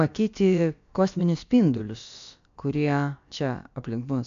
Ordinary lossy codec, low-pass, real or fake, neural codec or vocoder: AAC, 64 kbps; 7.2 kHz; fake; codec, 16 kHz, about 1 kbps, DyCAST, with the encoder's durations